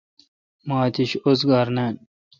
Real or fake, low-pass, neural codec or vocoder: real; 7.2 kHz; none